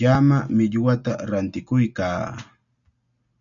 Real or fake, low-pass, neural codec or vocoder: real; 7.2 kHz; none